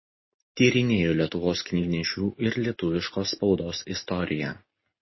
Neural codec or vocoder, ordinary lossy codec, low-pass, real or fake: none; MP3, 24 kbps; 7.2 kHz; real